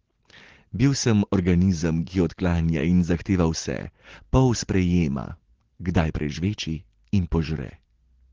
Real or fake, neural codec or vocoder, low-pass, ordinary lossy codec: real; none; 7.2 kHz; Opus, 16 kbps